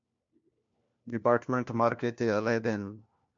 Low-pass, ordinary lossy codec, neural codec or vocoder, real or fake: 7.2 kHz; MP3, 48 kbps; codec, 16 kHz, 1 kbps, FunCodec, trained on LibriTTS, 50 frames a second; fake